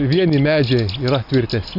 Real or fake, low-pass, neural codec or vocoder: real; 5.4 kHz; none